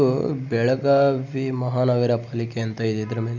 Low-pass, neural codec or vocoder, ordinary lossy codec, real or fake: 7.2 kHz; none; none; real